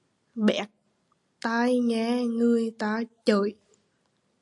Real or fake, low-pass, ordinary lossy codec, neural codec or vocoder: real; 10.8 kHz; AAC, 64 kbps; none